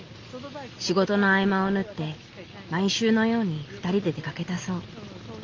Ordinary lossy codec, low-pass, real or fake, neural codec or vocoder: Opus, 32 kbps; 7.2 kHz; real; none